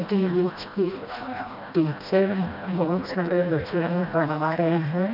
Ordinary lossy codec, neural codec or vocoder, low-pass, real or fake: none; codec, 16 kHz, 1 kbps, FreqCodec, smaller model; 5.4 kHz; fake